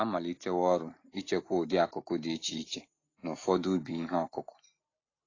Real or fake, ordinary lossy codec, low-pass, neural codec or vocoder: real; AAC, 32 kbps; 7.2 kHz; none